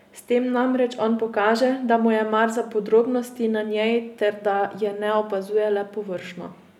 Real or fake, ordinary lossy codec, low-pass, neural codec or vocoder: real; none; 19.8 kHz; none